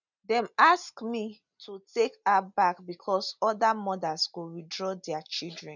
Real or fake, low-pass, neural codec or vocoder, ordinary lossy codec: real; 7.2 kHz; none; none